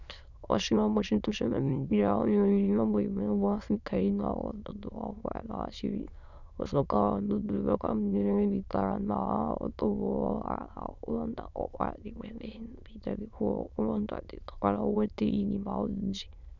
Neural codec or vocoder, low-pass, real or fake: autoencoder, 22.05 kHz, a latent of 192 numbers a frame, VITS, trained on many speakers; 7.2 kHz; fake